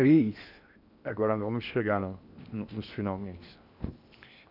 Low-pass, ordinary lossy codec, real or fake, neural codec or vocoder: 5.4 kHz; none; fake; codec, 16 kHz in and 24 kHz out, 0.8 kbps, FocalCodec, streaming, 65536 codes